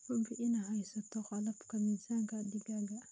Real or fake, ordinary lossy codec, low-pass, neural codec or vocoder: real; none; none; none